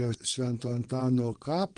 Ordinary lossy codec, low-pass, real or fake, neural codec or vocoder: Opus, 24 kbps; 9.9 kHz; fake; vocoder, 22.05 kHz, 80 mel bands, WaveNeXt